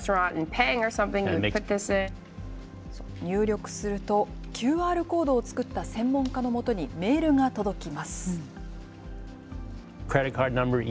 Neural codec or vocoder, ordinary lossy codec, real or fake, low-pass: none; none; real; none